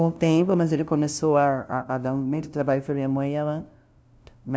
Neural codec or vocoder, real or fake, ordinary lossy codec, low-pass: codec, 16 kHz, 0.5 kbps, FunCodec, trained on LibriTTS, 25 frames a second; fake; none; none